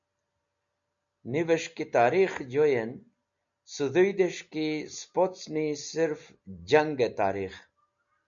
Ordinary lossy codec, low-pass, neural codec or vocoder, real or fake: MP3, 64 kbps; 7.2 kHz; none; real